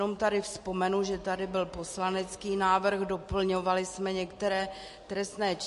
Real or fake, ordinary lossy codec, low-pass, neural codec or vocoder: real; MP3, 48 kbps; 14.4 kHz; none